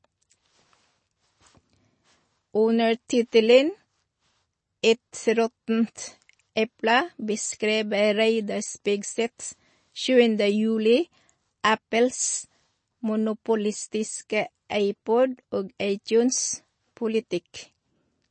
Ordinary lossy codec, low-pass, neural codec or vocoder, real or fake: MP3, 32 kbps; 9.9 kHz; none; real